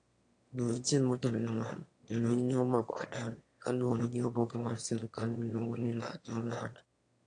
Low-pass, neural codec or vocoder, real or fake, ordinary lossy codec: 9.9 kHz; autoencoder, 22.05 kHz, a latent of 192 numbers a frame, VITS, trained on one speaker; fake; AAC, 48 kbps